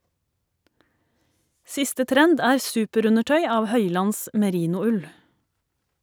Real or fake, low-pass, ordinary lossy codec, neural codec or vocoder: real; none; none; none